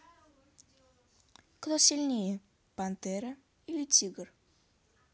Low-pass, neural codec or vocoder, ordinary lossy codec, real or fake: none; none; none; real